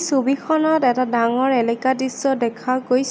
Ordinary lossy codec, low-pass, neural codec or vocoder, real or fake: none; none; none; real